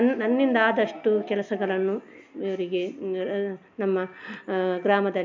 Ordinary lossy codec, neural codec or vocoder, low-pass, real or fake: MP3, 64 kbps; none; 7.2 kHz; real